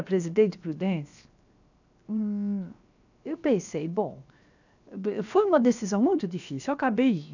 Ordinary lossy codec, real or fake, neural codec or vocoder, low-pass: none; fake; codec, 16 kHz, 0.7 kbps, FocalCodec; 7.2 kHz